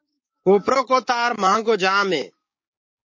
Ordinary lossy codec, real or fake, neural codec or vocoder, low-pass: MP3, 32 kbps; fake; codec, 16 kHz, 6 kbps, DAC; 7.2 kHz